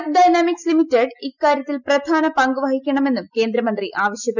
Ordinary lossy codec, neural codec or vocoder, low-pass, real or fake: none; none; 7.2 kHz; real